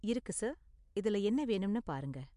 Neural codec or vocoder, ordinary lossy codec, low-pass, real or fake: none; MP3, 64 kbps; 10.8 kHz; real